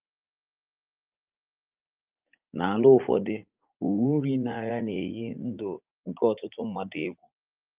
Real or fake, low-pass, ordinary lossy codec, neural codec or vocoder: fake; 3.6 kHz; Opus, 32 kbps; vocoder, 44.1 kHz, 80 mel bands, Vocos